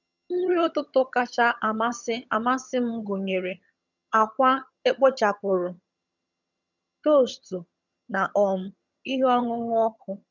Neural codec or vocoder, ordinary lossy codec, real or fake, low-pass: vocoder, 22.05 kHz, 80 mel bands, HiFi-GAN; none; fake; 7.2 kHz